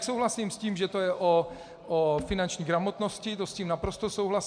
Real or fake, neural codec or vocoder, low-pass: real; none; 9.9 kHz